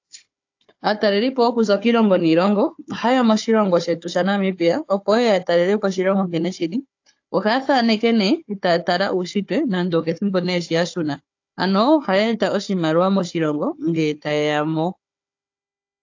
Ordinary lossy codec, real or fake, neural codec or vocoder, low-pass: AAC, 48 kbps; fake; codec, 16 kHz, 4 kbps, FunCodec, trained on Chinese and English, 50 frames a second; 7.2 kHz